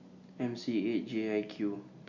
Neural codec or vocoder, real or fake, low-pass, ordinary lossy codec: none; real; 7.2 kHz; Opus, 64 kbps